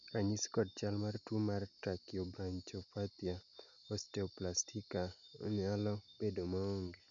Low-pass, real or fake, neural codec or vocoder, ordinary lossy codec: 7.2 kHz; real; none; none